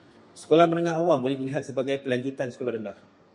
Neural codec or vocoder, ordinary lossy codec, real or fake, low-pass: codec, 32 kHz, 1.9 kbps, SNAC; MP3, 48 kbps; fake; 10.8 kHz